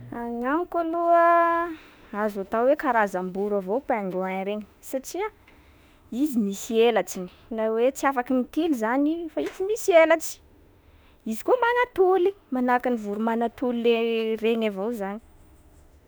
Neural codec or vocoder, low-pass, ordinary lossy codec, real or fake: autoencoder, 48 kHz, 32 numbers a frame, DAC-VAE, trained on Japanese speech; none; none; fake